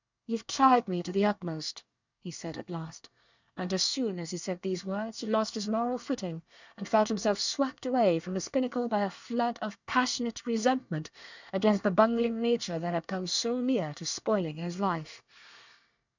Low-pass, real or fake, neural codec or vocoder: 7.2 kHz; fake; codec, 24 kHz, 1 kbps, SNAC